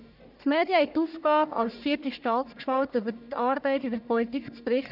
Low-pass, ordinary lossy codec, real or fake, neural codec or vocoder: 5.4 kHz; none; fake; codec, 44.1 kHz, 1.7 kbps, Pupu-Codec